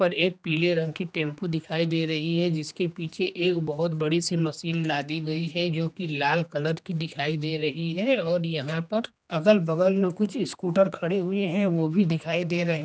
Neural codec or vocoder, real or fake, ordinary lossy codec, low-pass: codec, 16 kHz, 2 kbps, X-Codec, HuBERT features, trained on general audio; fake; none; none